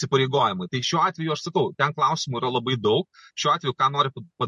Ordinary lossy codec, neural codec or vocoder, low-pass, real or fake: MP3, 48 kbps; none; 14.4 kHz; real